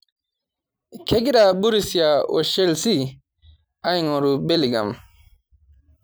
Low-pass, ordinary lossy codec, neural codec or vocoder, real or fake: none; none; none; real